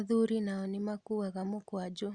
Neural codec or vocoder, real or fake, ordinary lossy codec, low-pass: none; real; Opus, 64 kbps; 9.9 kHz